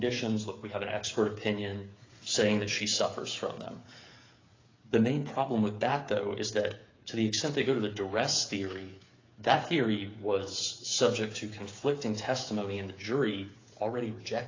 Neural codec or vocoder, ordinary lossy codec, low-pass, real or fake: codec, 16 kHz, 8 kbps, FreqCodec, smaller model; AAC, 32 kbps; 7.2 kHz; fake